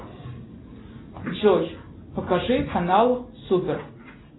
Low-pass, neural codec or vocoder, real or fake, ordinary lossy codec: 7.2 kHz; none; real; AAC, 16 kbps